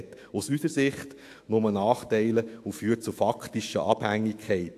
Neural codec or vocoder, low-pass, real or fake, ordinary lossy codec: autoencoder, 48 kHz, 128 numbers a frame, DAC-VAE, trained on Japanese speech; 14.4 kHz; fake; AAC, 64 kbps